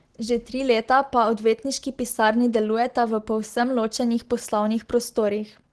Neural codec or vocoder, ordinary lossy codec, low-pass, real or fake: none; Opus, 16 kbps; 10.8 kHz; real